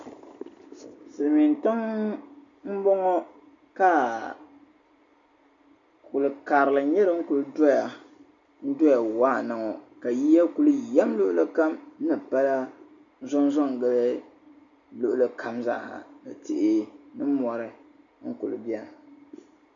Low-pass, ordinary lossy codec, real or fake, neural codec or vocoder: 7.2 kHz; MP3, 64 kbps; real; none